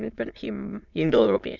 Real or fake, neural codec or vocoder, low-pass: fake; autoencoder, 22.05 kHz, a latent of 192 numbers a frame, VITS, trained on many speakers; 7.2 kHz